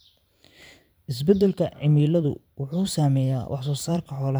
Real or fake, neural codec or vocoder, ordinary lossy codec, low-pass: fake; vocoder, 44.1 kHz, 128 mel bands every 512 samples, BigVGAN v2; none; none